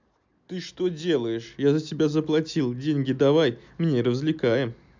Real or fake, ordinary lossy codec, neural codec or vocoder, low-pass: real; MP3, 64 kbps; none; 7.2 kHz